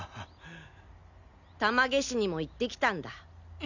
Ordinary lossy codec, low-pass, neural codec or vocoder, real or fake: none; 7.2 kHz; none; real